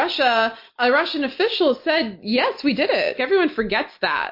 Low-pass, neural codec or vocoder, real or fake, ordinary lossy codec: 5.4 kHz; none; real; MP3, 32 kbps